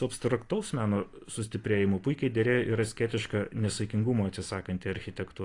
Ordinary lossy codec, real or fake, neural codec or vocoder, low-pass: AAC, 48 kbps; real; none; 10.8 kHz